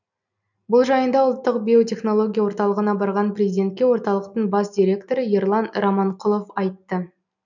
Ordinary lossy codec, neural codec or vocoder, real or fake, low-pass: none; none; real; 7.2 kHz